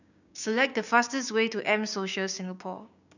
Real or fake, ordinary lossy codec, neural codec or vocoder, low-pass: fake; none; codec, 16 kHz, 6 kbps, DAC; 7.2 kHz